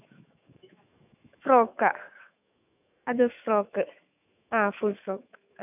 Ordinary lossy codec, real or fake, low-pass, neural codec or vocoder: none; fake; 3.6 kHz; autoencoder, 48 kHz, 128 numbers a frame, DAC-VAE, trained on Japanese speech